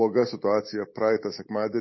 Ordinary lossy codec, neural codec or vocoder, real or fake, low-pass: MP3, 24 kbps; none; real; 7.2 kHz